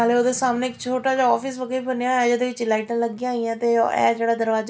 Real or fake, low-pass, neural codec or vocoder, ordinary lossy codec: real; none; none; none